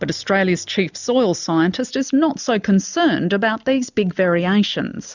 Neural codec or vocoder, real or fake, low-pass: none; real; 7.2 kHz